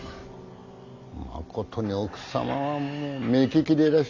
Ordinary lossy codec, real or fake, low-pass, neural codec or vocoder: MP3, 48 kbps; real; 7.2 kHz; none